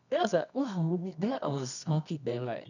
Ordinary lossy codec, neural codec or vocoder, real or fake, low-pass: none; codec, 24 kHz, 0.9 kbps, WavTokenizer, medium music audio release; fake; 7.2 kHz